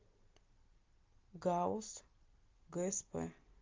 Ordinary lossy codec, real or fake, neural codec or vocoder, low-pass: Opus, 32 kbps; real; none; 7.2 kHz